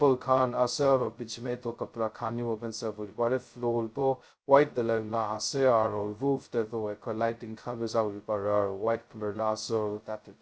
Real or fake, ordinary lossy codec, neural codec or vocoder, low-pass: fake; none; codec, 16 kHz, 0.2 kbps, FocalCodec; none